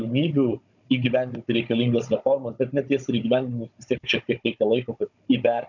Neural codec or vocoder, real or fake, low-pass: codec, 16 kHz, 16 kbps, FunCodec, trained on Chinese and English, 50 frames a second; fake; 7.2 kHz